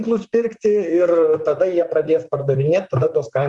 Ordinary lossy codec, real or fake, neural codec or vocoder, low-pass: Opus, 64 kbps; fake; vocoder, 44.1 kHz, 128 mel bands, Pupu-Vocoder; 10.8 kHz